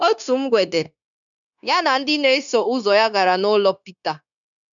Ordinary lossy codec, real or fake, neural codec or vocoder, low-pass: none; fake; codec, 16 kHz, 0.9 kbps, LongCat-Audio-Codec; 7.2 kHz